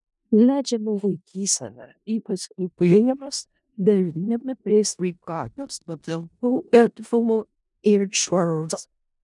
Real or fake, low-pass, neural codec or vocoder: fake; 10.8 kHz; codec, 16 kHz in and 24 kHz out, 0.4 kbps, LongCat-Audio-Codec, four codebook decoder